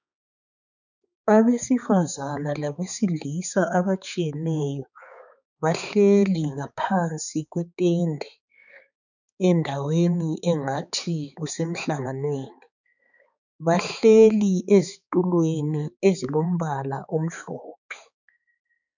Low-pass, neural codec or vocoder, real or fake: 7.2 kHz; codec, 16 kHz, 4 kbps, X-Codec, HuBERT features, trained on balanced general audio; fake